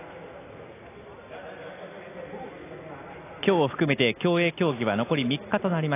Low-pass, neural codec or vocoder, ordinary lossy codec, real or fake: 3.6 kHz; none; AAC, 24 kbps; real